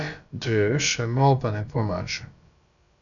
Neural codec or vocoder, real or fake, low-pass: codec, 16 kHz, about 1 kbps, DyCAST, with the encoder's durations; fake; 7.2 kHz